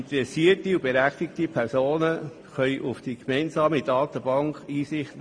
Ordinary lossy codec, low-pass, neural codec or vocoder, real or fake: MP3, 64 kbps; 9.9 kHz; none; real